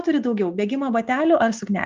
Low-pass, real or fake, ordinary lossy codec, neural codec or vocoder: 7.2 kHz; real; Opus, 32 kbps; none